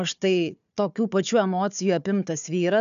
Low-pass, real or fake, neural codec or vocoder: 7.2 kHz; fake; codec, 16 kHz, 4 kbps, FunCodec, trained on Chinese and English, 50 frames a second